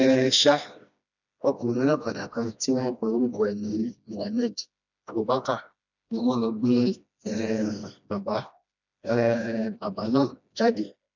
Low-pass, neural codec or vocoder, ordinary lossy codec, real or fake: 7.2 kHz; codec, 16 kHz, 1 kbps, FreqCodec, smaller model; none; fake